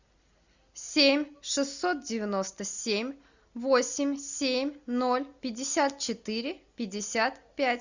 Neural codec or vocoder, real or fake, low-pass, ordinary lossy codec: none; real; 7.2 kHz; Opus, 64 kbps